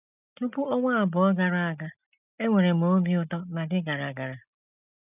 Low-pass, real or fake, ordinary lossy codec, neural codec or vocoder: 3.6 kHz; real; none; none